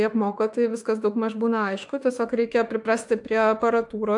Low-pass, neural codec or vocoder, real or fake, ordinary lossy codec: 10.8 kHz; autoencoder, 48 kHz, 32 numbers a frame, DAC-VAE, trained on Japanese speech; fake; AAC, 64 kbps